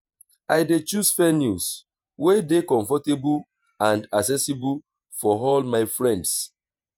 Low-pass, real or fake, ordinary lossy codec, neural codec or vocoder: none; fake; none; vocoder, 48 kHz, 128 mel bands, Vocos